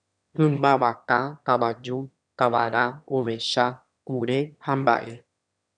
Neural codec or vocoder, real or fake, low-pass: autoencoder, 22.05 kHz, a latent of 192 numbers a frame, VITS, trained on one speaker; fake; 9.9 kHz